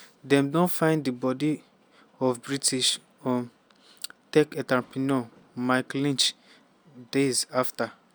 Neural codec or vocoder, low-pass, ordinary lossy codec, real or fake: none; none; none; real